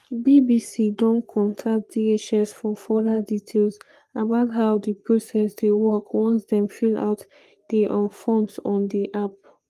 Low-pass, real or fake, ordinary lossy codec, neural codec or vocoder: 14.4 kHz; fake; Opus, 32 kbps; codec, 44.1 kHz, 3.4 kbps, Pupu-Codec